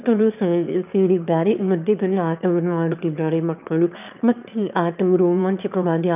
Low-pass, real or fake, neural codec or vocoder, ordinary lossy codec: 3.6 kHz; fake; autoencoder, 22.05 kHz, a latent of 192 numbers a frame, VITS, trained on one speaker; none